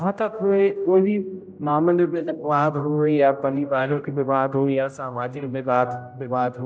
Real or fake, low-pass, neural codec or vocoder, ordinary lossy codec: fake; none; codec, 16 kHz, 0.5 kbps, X-Codec, HuBERT features, trained on general audio; none